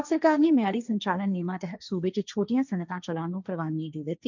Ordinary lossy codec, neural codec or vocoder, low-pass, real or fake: none; codec, 16 kHz, 1.1 kbps, Voila-Tokenizer; 7.2 kHz; fake